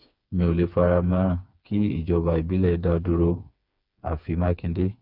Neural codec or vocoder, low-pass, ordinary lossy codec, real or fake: codec, 16 kHz, 4 kbps, FreqCodec, smaller model; 5.4 kHz; Opus, 64 kbps; fake